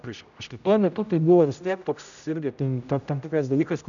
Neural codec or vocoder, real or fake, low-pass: codec, 16 kHz, 0.5 kbps, X-Codec, HuBERT features, trained on general audio; fake; 7.2 kHz